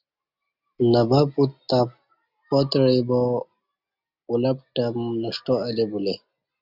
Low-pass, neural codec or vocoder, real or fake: 5.4 kHz; none; real